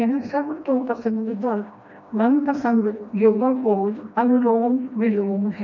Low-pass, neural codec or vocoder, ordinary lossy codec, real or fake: 7.2 kHz; codec, 16 kHz, 1 kbps, FreqCodec, smaller model; none; fake